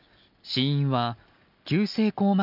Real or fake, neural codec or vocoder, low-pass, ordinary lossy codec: real; none; 5.4 kHz; none